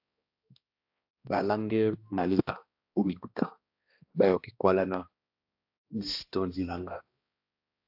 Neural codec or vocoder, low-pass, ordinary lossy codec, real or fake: codec, 16 kHz, 1 kbps, X-Codec, HuBERT features, trained on balanced general audio; 5.4 kHz; AAC, 48 kbps; fake